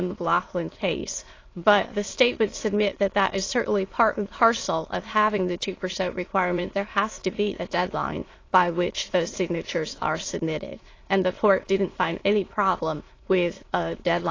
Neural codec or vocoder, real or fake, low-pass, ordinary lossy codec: autoencoder, 22.05 kHz, a latent of 192 numbers a frame, VITS, trained on many speakers; fake; 7.2 kHz; AAC, 32 kbps